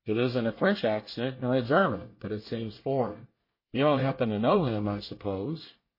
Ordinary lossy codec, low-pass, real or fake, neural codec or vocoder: MP3, 24 kbps; 5.4 kHz; fake; codec, 24 kHz, 1 kbps, SNAC